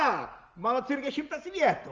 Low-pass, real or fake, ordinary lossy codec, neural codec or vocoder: 7.2 kHz; real; Opus, 24 kbps; none